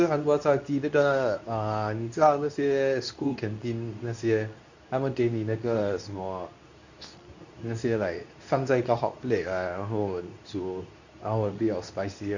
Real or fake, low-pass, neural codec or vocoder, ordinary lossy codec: fake; 7.2 kHz; codec, 24 kHz, 0.9 kbps, WavTokenizer, medium speech release version 2; none